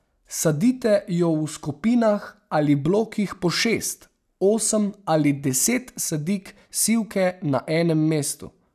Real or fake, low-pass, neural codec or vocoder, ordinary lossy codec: real; 14.4 kHz; none; none